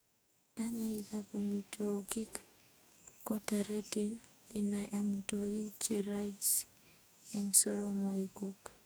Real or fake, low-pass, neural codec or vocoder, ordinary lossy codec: fake; none; codec, 44.1 kHz, 2.6 kbps, DAC; none